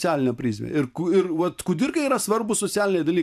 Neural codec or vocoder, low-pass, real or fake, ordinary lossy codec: vocoder, 44.1 kHz, 128 mel bands every 512 samples, BigVGAN v2; 14.4 kHz; fake; AAC, 96 kbps